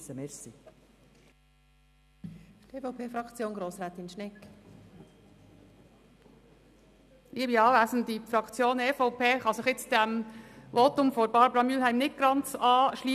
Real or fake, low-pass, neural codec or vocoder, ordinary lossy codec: real; 14.4 kHz; none; none